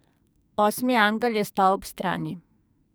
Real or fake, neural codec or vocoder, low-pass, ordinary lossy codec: fake; codec, 44.1 kHz, 2.6 kbps, SNAC; none; none